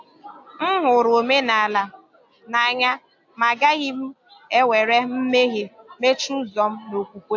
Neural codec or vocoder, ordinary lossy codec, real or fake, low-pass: none; AAC, 48 kbps; real; 7.2 kHz